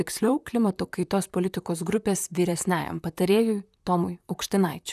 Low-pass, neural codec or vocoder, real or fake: 14.4 kHz; vocoder, 44.1 kHz, 128 mel bands, Pupu-Vocoder; fake